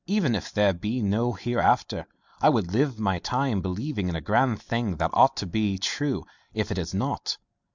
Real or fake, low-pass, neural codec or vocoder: real; 7.2 kHz; none